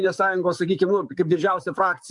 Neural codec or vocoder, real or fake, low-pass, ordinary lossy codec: codec, 44.1 kHz, 7.8 kbps, DAC; fake; 10.8 kHz; MP3, 96 kbps